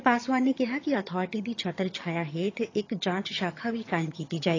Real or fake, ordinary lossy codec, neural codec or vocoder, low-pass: fake; AAC, 32 kbps; vocoder, 22.05 kHz, 80 mel bands, HiFi-GAN; 7.2 kHz